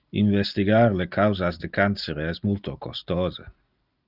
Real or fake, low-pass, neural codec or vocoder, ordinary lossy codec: real; 5.4 kHz; none; Opus, 32 kbps